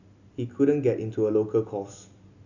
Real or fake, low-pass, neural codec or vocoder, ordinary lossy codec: real; 7.2 kHz; none; none